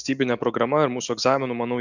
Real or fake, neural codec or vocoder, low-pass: real; none; 7.2 kHz